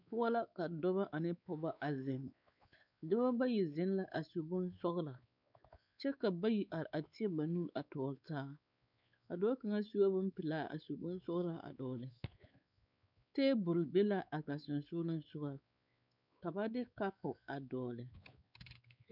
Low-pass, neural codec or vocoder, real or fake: 5.4 kHz; codec, 16 kHz, 4 kbps, X-Codec, WavLM features, trained on Multilingual LibriSpeech; fake